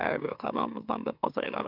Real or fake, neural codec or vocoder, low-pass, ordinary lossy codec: fake; autoencoder, 44.1 kHz, a latent of 192 numbers a frame, MeloTTS; 5.4 kHz; none